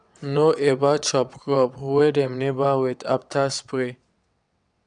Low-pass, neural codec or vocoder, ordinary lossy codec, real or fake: 9.9 kHz; vocoder, 22.05 kHz, 80 mel bands, WaveNeXt; none; fake